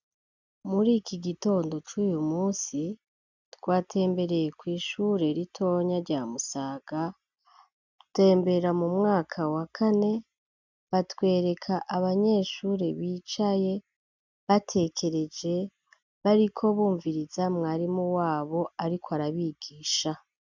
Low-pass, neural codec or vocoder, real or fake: 7.2 kHz; none; real